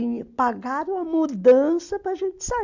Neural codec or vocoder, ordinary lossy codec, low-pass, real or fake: none; none; 7.2 kHz; real